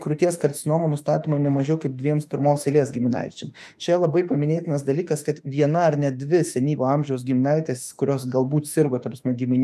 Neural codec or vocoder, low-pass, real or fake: autoencoder, 48 kHz, 32 numbers a frame, DAC-VAE, trained on Japanese speech; 14.4 kHz; fake